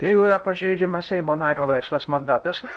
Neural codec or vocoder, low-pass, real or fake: codec, 16 kHz in and 24 kHz out, 0.8 kbps, FocalCodec, streaming, 65536 codes; 9.9 kHz; fake